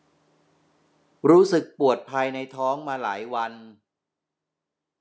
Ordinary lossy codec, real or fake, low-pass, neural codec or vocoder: none; real; none; none